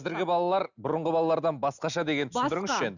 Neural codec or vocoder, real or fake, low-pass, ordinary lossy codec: none; real; 7.2 kHz; none